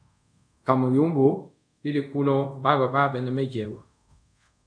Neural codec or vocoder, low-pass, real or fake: codec, 24 kHz, 0.5 kbps, DualCodec; 9.9 kHz; fake